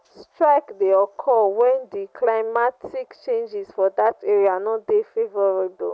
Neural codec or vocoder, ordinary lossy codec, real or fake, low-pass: none; none; real; none